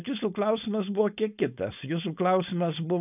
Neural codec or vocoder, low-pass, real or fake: codec, 16 kHz, 4.8 kbps, FACodec; 3.6 kHz; fake